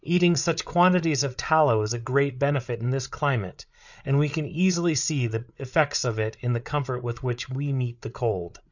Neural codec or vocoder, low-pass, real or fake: codec, 16 kHz, 8 kbps, FreqCodec, larger model; 7.2 kHz; fake